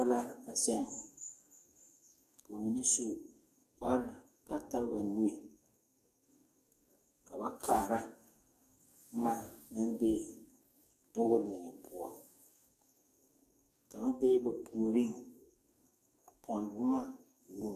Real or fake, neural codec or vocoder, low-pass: fake; codec, 44.1 kHz, 2.6 kbps, DAC; 14.4 kHz